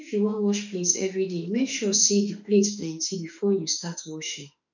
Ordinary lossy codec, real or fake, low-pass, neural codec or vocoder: none; fake; 7.2 kHz; autoencoder, 48 kHz, 32 numbers a frame, DAC-VAE, trained on Japanese speech